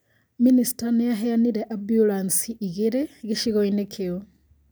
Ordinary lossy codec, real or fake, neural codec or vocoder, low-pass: none; real; none; none